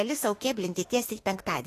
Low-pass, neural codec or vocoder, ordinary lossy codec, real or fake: 14.4 kHz; autoencoder, 48 kHz, 32 numbers a frame, DAC-VAE, trained on Japanese speech; AAC, 48 kbps; fake